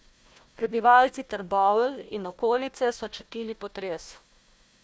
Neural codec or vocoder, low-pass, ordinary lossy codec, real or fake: codec, 16 kHz, 1 kbps, FunCodec, trained on Chinese and English, 50 frames a second; none; none; fake